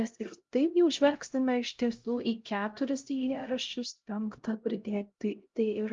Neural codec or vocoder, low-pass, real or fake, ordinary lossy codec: codec, 16 kHz, 0.5 kbps, X-Codec, HuBERT features, trained on LibriSpeech; 7.2 kHz; fake; Opus, 32 kbps